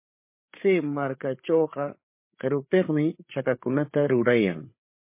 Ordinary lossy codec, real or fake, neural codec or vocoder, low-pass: MP3, 24 kbps; fake; codec, 16 kHz, 8 kbps, FreqCodec, larger model; 3.6 kHz